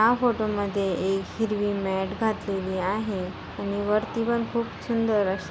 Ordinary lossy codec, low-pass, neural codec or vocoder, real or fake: none; none; none; real